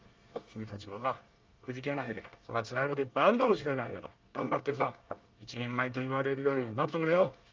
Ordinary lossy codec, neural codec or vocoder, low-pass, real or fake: Opus, 32 kbps; codec, 24 kHz, 1 kbps, SNAC; 7.2 kHz; fake